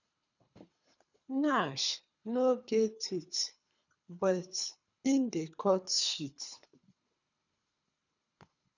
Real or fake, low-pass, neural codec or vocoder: fake; 7.2 kHz; codec, 24 kHz, 3 kbps, HILCodec